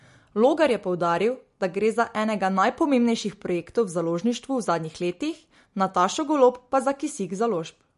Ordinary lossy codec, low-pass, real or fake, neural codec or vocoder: MP3, 48 kbps; 14.4 kHz; real; none